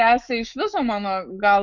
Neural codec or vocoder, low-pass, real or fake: autoencoder, 48 kHz, 128 numbers a frame, DAC-VAE, trained on Japanese speech; 7.2 kHz; fake